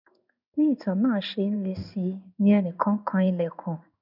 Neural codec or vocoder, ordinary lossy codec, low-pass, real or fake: codec, 16 kHz in and 24 kHz out, 1 kbps, XY-Tokenizer; none; 5.4 kHz; fake